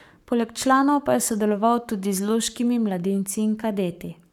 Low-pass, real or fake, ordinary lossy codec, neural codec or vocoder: 19.8 kHz; fake; none; codec, 44.1 kHz, 7.8 kbps, Pupu-Codec